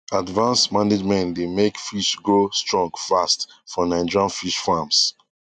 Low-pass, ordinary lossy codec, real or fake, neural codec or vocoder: 9.9 kHz; none; real; none